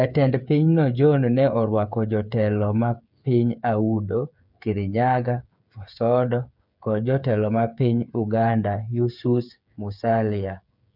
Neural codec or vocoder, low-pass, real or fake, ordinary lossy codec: codec, 16 kHz, 8 kbps, FreqCodec, smaller model; 5.4 kHz; fake; none